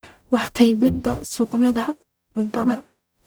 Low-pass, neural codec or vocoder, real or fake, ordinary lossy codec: none; codec, 44.1 kHz, 0.9 kbps, DAC; fake; none